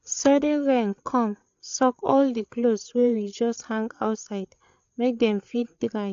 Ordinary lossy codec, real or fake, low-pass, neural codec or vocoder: AAC, 64 kbps; fake; 7.2 kHz; codec, 16 kHz, 8 kbps, FreqCodec, larger model